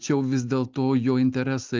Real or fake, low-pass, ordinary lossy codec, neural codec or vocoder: real; 7.2 kHz; Opus, 24 kbps; none